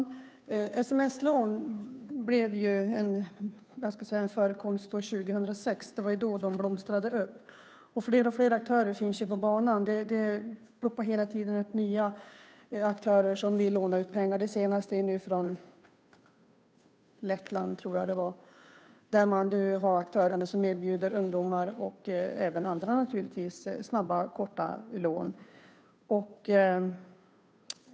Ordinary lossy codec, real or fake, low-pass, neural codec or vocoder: none; fake; none; codec, 16 kHz, 2 kbps, FunCodec, trained on Chinese and English, 25 frames a second